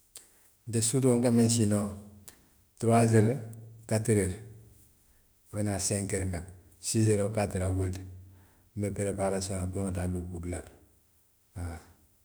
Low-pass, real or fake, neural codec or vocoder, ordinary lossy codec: none; fake; autoencoder, 48 kHz, 32 numbers a frame, DAC-VAE, trained on Japanese speech; none